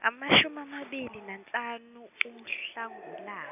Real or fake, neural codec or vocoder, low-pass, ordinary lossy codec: real; none; 3.6 kHz; none